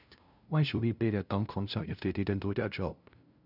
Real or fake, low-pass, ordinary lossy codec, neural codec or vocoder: fake; 5.4 kHz; none; codec, 16 kHz, 0.5 kbps, FunCodec, trained on LibriTTS, 25 frames a second